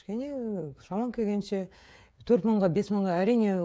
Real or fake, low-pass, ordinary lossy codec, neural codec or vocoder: fake; none; none; codec, 16 kHz, 16 kbps, FreqCodec, smaller model